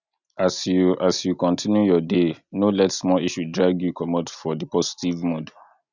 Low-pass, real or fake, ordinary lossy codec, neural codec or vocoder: 7.2 kHz; real; none; none